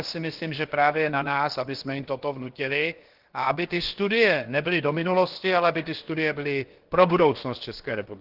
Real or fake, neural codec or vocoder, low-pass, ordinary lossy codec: fake; codec, 16 kHz, about 1 kbps, DyCAST, with the encoder's durations; 5.4 kHz; Opus, 16 kbps